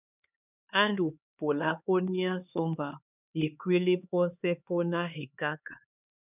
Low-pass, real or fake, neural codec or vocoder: 3.6 kHz; fake; codec, 16 kHz, 4 kbps, X-Codec, HuBERT features, trained on LibriSpeech